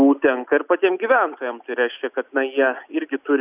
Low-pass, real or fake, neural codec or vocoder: 3.6 kHz; real; none